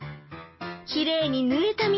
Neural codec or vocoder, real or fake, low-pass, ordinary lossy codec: none; real; 7.2 kHz; MP3, 24 kbps